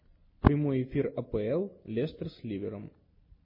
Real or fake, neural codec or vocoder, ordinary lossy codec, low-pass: real; none; MP3, 24 kbps; 5.4 kHz